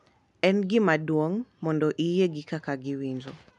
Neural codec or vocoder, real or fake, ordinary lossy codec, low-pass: none; real; none; 10.8 kHz